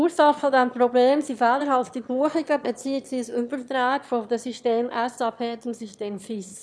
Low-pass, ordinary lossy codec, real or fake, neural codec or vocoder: none; none; fake; autoencoder, 22.05 kHz, a latent of 192 numbers a frame, VITS, trained on one speaker